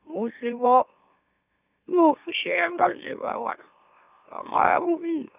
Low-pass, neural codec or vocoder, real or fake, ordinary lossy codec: 3.6 kHz; autoencoder, 44.1 kHz, a latent of 192 numbers a frame, MeloTTS; fake; none